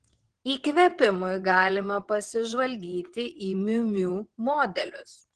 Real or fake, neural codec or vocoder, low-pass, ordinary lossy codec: fake; vocoder, 22.05 kHz, 80 mel bands, WaveNeXt; 9.9 kHz; Opus, 16 kbps